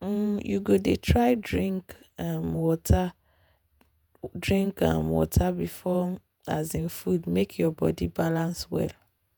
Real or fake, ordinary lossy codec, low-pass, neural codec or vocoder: fake; none; none; vocoder, 48 kHz, 128 mel bands, Vocos